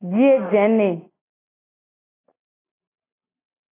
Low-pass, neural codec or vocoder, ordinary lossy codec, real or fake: 3.6 kHz; none; AAC, 16 kbps; real